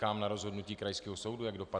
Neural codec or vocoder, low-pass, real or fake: none; 9.9 kHz; real